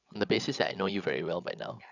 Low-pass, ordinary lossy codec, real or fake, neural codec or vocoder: 7.2 kHz; none; fake; codec, 16 kHz, 16 kbps, FreqCodec, larger model